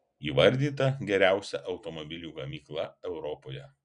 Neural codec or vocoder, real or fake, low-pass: none; real; 9.9 kHz